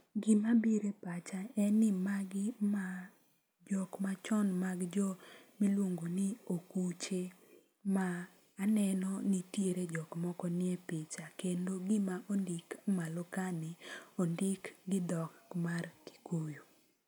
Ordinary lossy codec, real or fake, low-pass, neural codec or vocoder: none; real; none; none